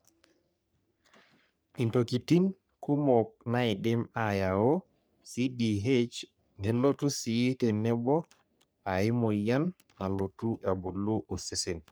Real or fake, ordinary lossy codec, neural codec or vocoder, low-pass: fake; none; codec, 44.1 kHz, 3.4 kbps, Pupu-Codec; none